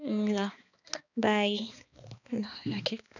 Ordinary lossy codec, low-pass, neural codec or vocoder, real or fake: none; 7.2 kHz; codec, 16 kHz, 2 kbps, X-Codec, HuBERT features, trained on balanced general audio; fake